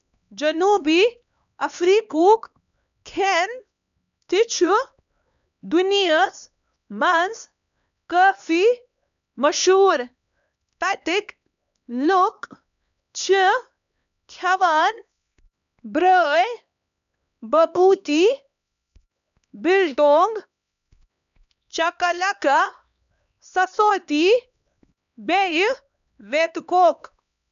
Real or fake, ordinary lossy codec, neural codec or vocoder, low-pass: fake; none; codec, 16 kHz, 2 kbps, X-Codec, HuBERT features, trained on LibriSpeech; 7.2 kHz